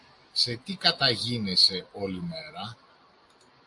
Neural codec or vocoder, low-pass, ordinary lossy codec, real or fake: none; 10.8 kHz; AAC, 64 kbps; real